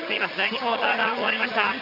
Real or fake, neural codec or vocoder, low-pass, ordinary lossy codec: fake; vocoder, 22.05 kHz, 80 mel bands, HiFi-GAN; 5.4 kHz; none